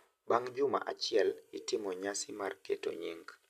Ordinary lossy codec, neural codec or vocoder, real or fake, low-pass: none; none; real; 14.4 kHz